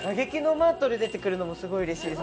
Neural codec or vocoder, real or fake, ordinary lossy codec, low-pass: none; real; none; none